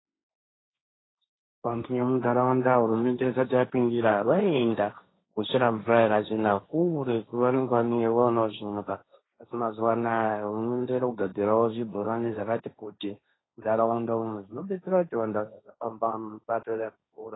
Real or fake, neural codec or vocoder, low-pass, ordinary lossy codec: fake; codec, 16 kHz, 1.1 kbps, Voila-Tokenizer; 7.2 kHz; AAC, 16 kbps